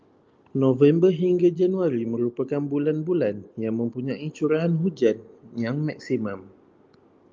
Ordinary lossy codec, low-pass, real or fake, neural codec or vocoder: Opus, 24 kbps; 7.2 kHz; real; none